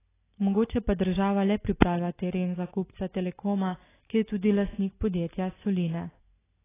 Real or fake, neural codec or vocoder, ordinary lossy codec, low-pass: real; none; AAC, 16 kbps; 3.6 kHz